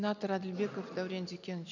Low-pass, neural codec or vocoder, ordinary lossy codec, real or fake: 7.2 kHz; none; none; real